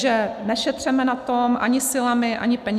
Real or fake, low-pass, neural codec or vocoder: real; 14.4 kHz; none